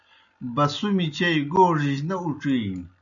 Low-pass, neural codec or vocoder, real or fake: 7.2 kHz; none; real